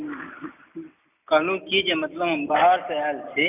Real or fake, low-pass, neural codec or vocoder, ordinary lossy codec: real; 3.6 kHz; none; none